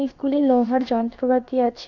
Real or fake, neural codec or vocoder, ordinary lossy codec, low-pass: fake; codec, 16 kHz, about 1 kbps, DyCAST, with the encoder's durations; none; 7.2 kHz